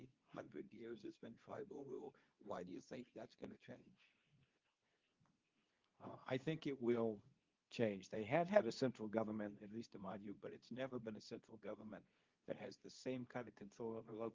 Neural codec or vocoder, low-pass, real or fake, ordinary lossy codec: codec, 24 kHz, 0.9 kbps, WavTokenizer, medium speech release version 2; 7.2 kHz; fake; Opus, 32 kbps